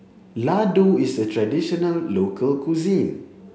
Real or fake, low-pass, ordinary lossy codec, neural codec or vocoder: real; none; none; none